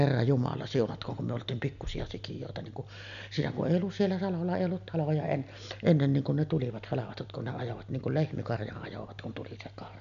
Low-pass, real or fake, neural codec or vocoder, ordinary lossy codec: 7.2 kHz; real; none; none